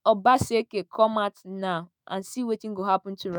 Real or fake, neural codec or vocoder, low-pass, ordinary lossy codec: fake; autoencoder, 48 kHz, 128 numbers a frame, DAC-VAE, trained on Japanese speech; none; none